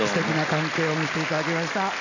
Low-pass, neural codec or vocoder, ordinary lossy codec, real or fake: 7.2 kHz; none; none; real